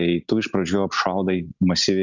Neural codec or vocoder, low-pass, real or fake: none; 7.2 kHz; real